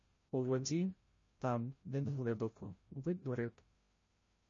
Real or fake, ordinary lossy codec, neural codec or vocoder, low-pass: fake; MP3, 32 kbps; codec, 16 kHz, 0.5 kbps, FreqCodec, larger model; 7.2 kHz